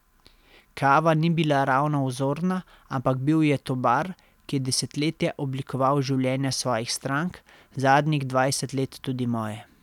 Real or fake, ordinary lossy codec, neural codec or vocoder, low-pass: real; none; none; 19.8 kHz